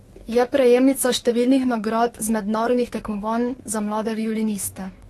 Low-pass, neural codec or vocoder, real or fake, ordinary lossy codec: 19.8 kHz; autoencoder, 48 kHz, 32 numbers a frame, DAC-VAE, trained on Japanese speech; fake; AAC, 32 kbps